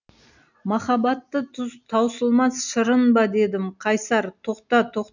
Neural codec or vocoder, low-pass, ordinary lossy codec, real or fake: none; 7.2 kHz; none; real